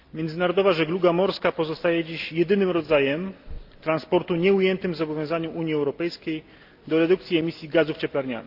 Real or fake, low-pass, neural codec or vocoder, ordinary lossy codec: real; 5.4 kHz; none; Opus, 24 kbps